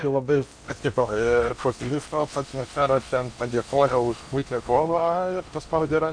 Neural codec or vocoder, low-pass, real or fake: codec, 16 kHz in and 24 kHz out, 0.8 kbps, FocalCodec, streaming, 65536 codes; 9.9 kHz; fake